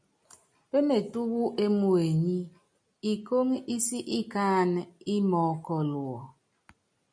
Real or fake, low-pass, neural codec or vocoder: real; 9.9 kHz; none